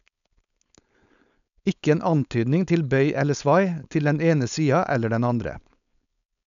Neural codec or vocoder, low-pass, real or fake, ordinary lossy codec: codec, 16 kHz, 4.8 kbps, FACodec; 7.2 kHz; fake; none